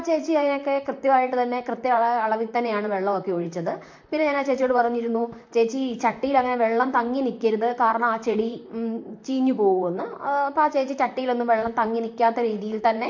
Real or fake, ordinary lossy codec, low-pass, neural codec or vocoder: fake; MP3, 48 kbps; 7.2 kHz; vocoder, 44.1 kHz, 128 mel bands, Pupu-Vocoder